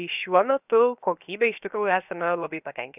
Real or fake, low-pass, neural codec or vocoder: fake; 3.6 kHz; codec, 16 kHz, 0.7 kbps, FocalCodec